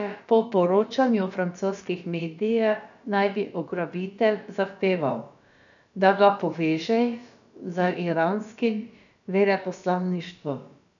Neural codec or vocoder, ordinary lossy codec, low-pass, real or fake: codec, 16 kHz, about 1 kbps, DyCAST, with the encoder's durations; none; 7.2 kHz; fake